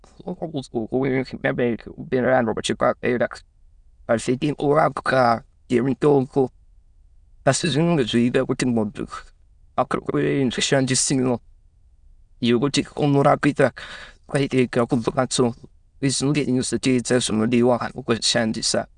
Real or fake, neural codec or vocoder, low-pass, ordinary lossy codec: fake; autoencoder, 22.05 kHz, a latent of 192 numbers a frame, VITS, trained on many speakers; 9.9 kHz; Opus, 64 kbps